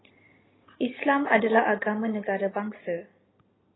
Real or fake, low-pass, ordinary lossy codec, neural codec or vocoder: real; 7.2 kHz; AAC, 16 kbps; none